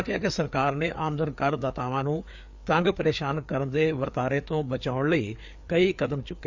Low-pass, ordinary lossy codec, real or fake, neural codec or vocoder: 7.2 kHz; Opus, 64 kbps; fake; codec, 16 kHz, 4 kbps, FreqCodec, larger model